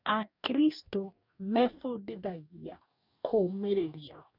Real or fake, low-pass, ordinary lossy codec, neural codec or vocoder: fake; 5.4 kHz; none; codec, 44.1 kHz, 2.6 kbps, DAC